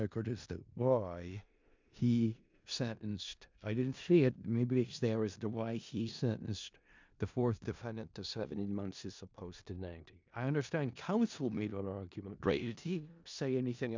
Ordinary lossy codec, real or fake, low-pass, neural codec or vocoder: MP3, 64 kbps; fake; 7.2 kHz; codec, 16 kHz in and 24 kHz out, 0.4 kbps, LongCat-Audio-Codec, four codebook decoder